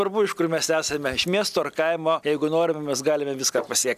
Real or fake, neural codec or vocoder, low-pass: real; none; 14.4 kHz